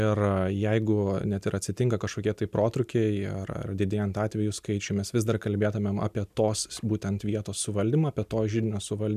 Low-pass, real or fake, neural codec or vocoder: 14.4 kHz; real; none